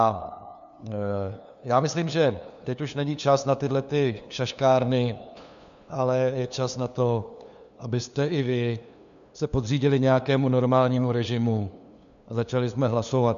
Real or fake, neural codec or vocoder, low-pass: fake; codec, 16 kHz, 2 kbps, FunCodec, trained on LibriTTS, 25 frames a second; 7.2 kHz